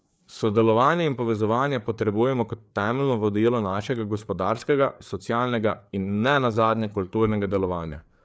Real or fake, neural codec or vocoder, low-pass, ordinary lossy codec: fake; codec, 16 kHz, 4 kbps, FreqCodec, larger model; none; none